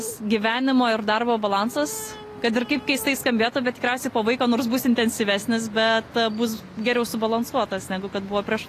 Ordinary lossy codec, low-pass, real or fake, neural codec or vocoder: AAC, 48 kbps; 14.4 kHz; real; none